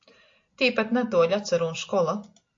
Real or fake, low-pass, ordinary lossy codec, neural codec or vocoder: real; 7.2 kHz; AAC, 48 kbps; none